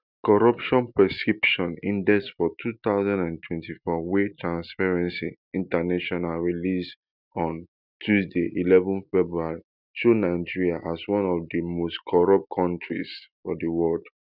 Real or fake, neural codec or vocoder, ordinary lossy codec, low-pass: real; none; none; 5.4 kHz